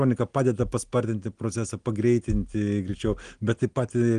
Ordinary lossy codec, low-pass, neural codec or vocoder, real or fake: Opus, 24 kbps; 9.9 kHz; none; real